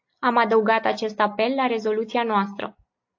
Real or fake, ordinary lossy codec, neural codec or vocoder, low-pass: real; AAC, 48 kbps; none; 7.2 kHz